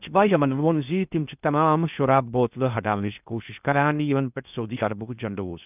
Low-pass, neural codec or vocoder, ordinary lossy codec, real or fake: 3.6 kHz; codec, 16 kHz in and 24 kHz out, 0.6 kbps, FocalCodec, streaming, 4096 codes; none; fake